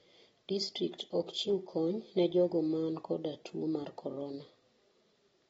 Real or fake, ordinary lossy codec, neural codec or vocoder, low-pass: real; AAC, 24 kbps; none; 19.8 kHz